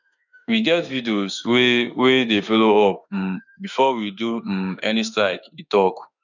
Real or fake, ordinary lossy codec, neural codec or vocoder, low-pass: fake; none; autoencoder, 48 kHz, 32 numbers a frame, DAC-VAE, trained on Japanese speech; 7.2 kHz